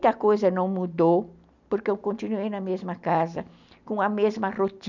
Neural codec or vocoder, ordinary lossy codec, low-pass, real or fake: none; none; 7.2 kHz; real